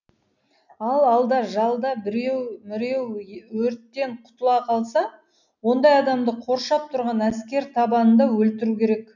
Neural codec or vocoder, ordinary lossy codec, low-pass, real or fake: none; none; 7.2 kHz; real